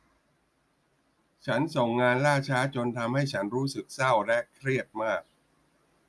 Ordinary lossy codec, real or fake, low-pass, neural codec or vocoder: none; real; none; none